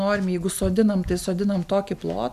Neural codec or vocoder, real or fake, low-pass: none; real; 14.4 kHz